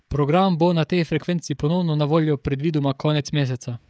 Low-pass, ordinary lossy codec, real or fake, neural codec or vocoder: none; none; fake; codec, 16 kHz, 16 kbps, FreqCodec, smaller model